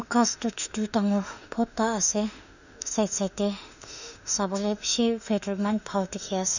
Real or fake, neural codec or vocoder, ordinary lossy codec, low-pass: fake; autoencoder, 48 kHz, 32 numbers a frame, DAC-VAE, trained on Japanese speech; none; 7.2 kHz